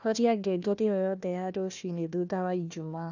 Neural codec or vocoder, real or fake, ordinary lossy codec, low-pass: codec, 16 kHz, 1 kbps, FunCodec, trained on Chinese and English, 50 frames a second; fake; none; 7.2 kHz